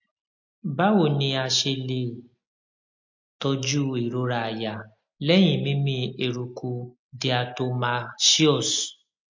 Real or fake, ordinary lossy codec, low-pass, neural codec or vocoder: real; MP3, 48 kbps; 7.2 kHz; none